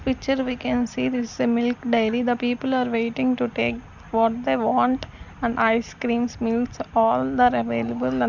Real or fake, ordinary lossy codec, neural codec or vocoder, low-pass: real; none; none; 7.2 kHz